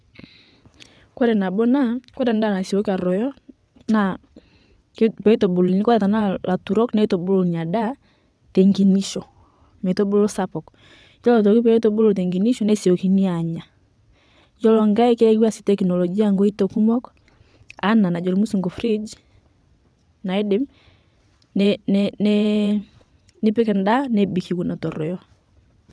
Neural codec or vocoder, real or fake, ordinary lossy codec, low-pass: vocoder, 22.05 kHz, 80 mel bands, WaveNeXt; fake; none; none